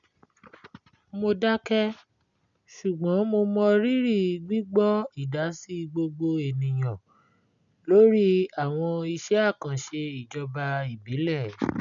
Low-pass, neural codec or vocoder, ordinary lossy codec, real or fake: 7.2 kHz; none; none; real